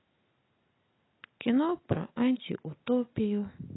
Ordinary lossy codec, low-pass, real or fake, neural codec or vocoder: AAC, 16 kbps; 7.2 kHz; real; none